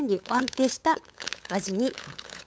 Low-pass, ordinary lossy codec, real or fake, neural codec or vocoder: none; none; fake; codec, 16 kHz, 8 kbps, FunCodec, trained on LibriTTS, 25 frames a second